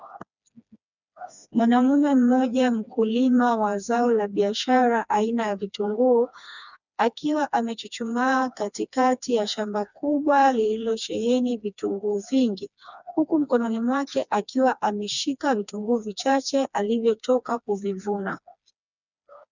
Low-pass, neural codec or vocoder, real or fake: 7.2 kHz; codec, 16 kHz, 2 kbps, FreqCodec, smaller model; fake